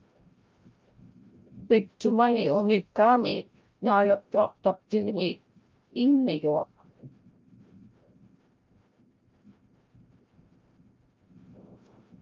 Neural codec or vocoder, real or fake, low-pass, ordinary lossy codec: codec, 16 kHz, 0.5 kbps, FreqCodec, larger model; fake; 7.2 kHz; Opus, 32 kbps